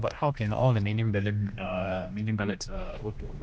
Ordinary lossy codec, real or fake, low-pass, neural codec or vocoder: none; fake; none; codec, 16 kHz, 1 kbps, X-Codec, HuBERT features, trained on general audio